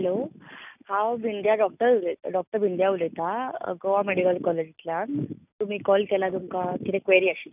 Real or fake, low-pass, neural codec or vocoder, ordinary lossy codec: real; 3.6 kHz; none; none